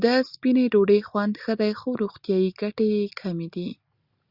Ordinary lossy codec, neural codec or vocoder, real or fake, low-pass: Opus, 64 kbps; none; real; 5.4 kHz